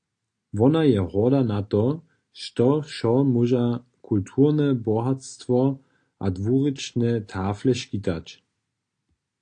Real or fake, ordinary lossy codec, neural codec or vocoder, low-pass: real; AAC, 48 kbps; none; 9.9 kHz